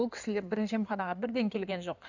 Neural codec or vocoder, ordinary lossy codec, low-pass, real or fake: codec, 16 kHz in and 24 kHz out, 2.2 kbps, FireRedTTS-2 codec; none; 7.2 kHz; fake